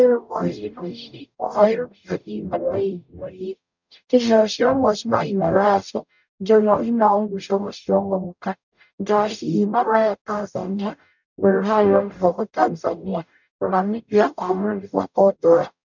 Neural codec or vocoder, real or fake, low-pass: codec, 44.1 kHz, 0.9 kbps, DAC; fake; 7.2 kHz